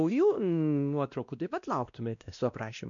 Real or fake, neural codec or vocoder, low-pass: fake; codec, 16 kHz, 1 kbps, X-Codec, WavLM features, trained on Multilingual LibriSpeech; 7.2 kHz